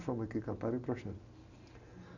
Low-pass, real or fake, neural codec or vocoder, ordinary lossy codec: 7.2 kHz; real; none; none